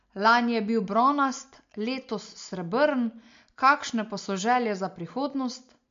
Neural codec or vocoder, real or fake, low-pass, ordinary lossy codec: none; real; 7.2 kHz; MP3, 48 kbps